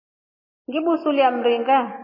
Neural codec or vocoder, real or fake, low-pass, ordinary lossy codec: none; real; 5.4 kHz; MP3, 24 kbps